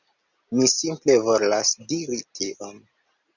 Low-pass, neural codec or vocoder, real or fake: 7.2 kHz; none; real